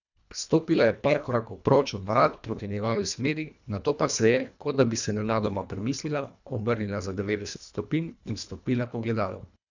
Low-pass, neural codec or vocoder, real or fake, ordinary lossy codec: 7.2 kHz; codec, 24 kHz, 1.5 kbps, HILCodec; fake; none